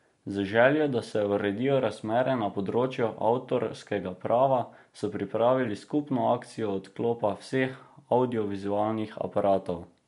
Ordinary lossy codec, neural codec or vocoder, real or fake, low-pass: MP3, 64 kbps; none; real; 10.8 kHz